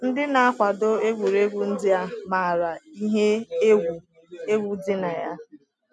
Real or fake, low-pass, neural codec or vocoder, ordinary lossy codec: real; none; none; none